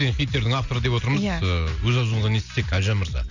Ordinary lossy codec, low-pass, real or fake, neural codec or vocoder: none; 7.2 kHz; real; none